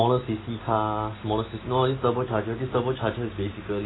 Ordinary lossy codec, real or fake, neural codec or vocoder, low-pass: AAC, 16 kbps; real; none; 7.2 kHz